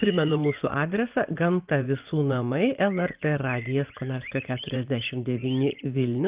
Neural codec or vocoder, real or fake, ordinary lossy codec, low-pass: vocoder, 24 kHz, 100 mel bands, Vocos; fake; Opus, 32 kbps; 3.6 kHz